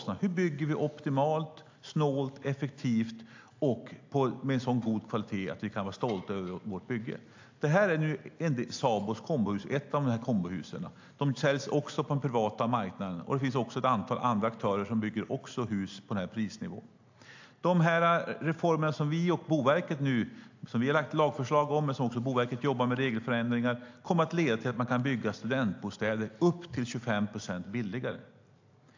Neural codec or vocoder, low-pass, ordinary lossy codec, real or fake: none; 7.2 kHz; AAC, 48 kbps; real